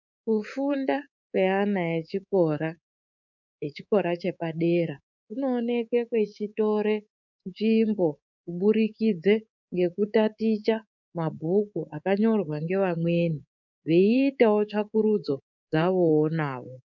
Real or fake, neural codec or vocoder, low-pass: fake; codec, 24 kHz, 3.1 kbps, DualCodec; 7.2 kHz